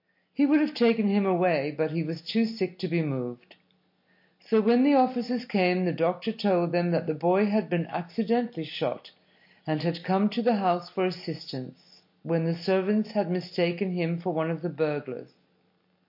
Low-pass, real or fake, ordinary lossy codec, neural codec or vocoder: 5.4 kHz; real; MP3, 32 kbps; none